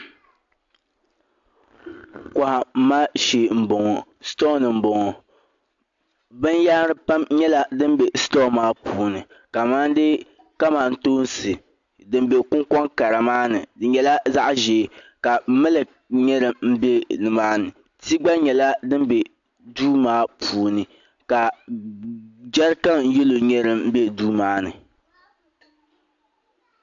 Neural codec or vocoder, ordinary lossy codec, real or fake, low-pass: none; AAC, 64 kbps; real; 7.2 kHz